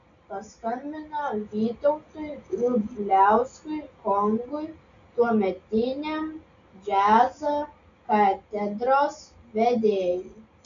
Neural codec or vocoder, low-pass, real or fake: none; 7.2 kHz; real